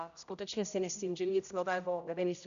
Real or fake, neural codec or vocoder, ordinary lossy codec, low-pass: fake; codec, 16 kHz, 0.5 kbps, X-Codec, HuBERT features, trained on general audio; MP3, 96 kbps; 7.2 kHz